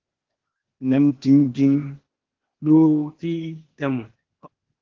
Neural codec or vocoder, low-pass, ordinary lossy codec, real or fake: codec, 16 kHz, 0.8 kbps, ZipCodec; 7.2 kHz; Opus, 16 kbps; fake